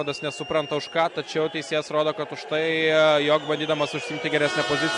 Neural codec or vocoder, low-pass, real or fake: none; 10.8 kHz; real